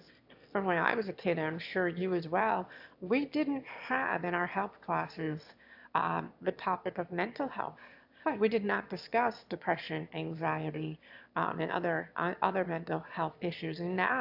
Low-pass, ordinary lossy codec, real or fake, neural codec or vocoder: 5.4 kHz; AAC, 48 kbps; fake; autoencoder, 22.05 kHz, a latent of 192 numbers a frame, VITS, trained on one speaker